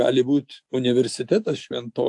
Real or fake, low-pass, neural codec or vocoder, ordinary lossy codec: real; 10.8 kHz; none; AAC, 64 kbps